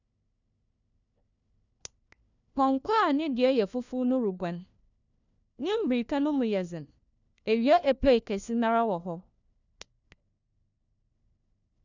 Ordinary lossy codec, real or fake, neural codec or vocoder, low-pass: none; fake; codec, 16 kHz, 1 kbps, FunCodec, trained on LibriTTS, 50 frames a second; 7.2 kHz